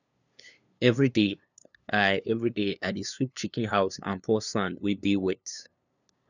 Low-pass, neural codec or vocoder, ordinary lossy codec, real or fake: 7.2 kHz; codec, 16 kHz, 2 kbps, FunCodec, trained on LibriTTS, 25 frames a second; none; fake